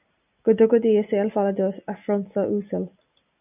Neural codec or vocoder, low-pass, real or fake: none; 3.6 kHz; real